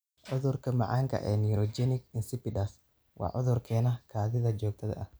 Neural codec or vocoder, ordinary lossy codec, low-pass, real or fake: none; none; none; real